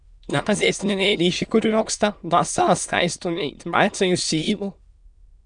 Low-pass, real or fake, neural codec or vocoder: 9.9 kHz; fake; autoencoder, 22.05 kHz, a latent of 192 numbers a frame, VITS, trained on many speakers